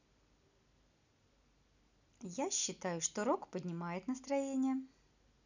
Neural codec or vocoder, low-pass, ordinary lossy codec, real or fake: none; 7.2 kHz; none; real